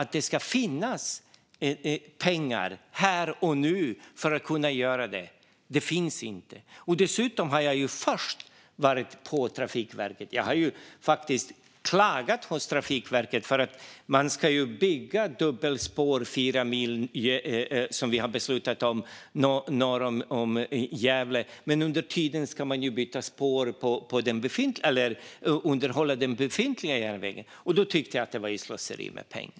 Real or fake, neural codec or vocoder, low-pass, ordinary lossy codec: real; none; none; none